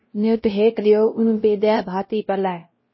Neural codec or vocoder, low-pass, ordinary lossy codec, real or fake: codec, 16 kHz, 0.5 kbps, X-Codec, WavLM features, trained on Multilingual LibriSpeech; 7.2 kHz; MP3, 24 kbps; fake